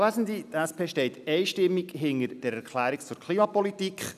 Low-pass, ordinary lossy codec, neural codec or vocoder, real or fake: 14.4 kHz; none; none; real